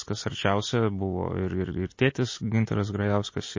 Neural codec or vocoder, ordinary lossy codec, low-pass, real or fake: none; MP3, 32 kbps; 7.2 kHz; real